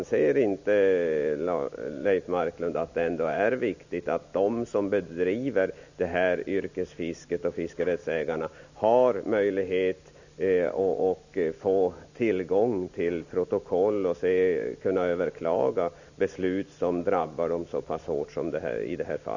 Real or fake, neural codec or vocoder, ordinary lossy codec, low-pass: real; none; none; 7.2 kHz